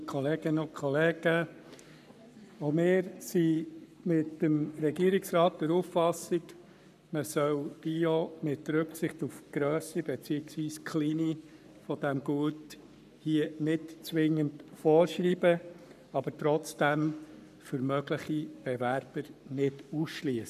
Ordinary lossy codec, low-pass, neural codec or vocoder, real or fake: none; 14.4 kHz; codec, 44.1 kHz, 7.8 kbps, Pupu-Codec; fake